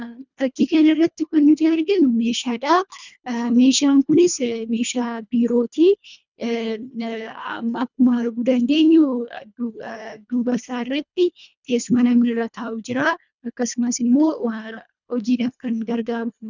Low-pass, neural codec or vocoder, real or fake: 7.2 kHz; codec, 24 kHz, 1.5 kbps, HILCodec; fake